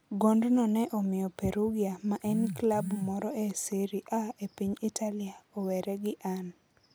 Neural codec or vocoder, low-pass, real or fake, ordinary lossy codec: none; none; real; none